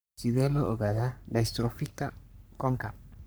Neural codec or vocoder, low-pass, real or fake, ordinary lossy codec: codec, 44.1 kHz, 3.4 kbps, Pupu-Codec; none; fake; none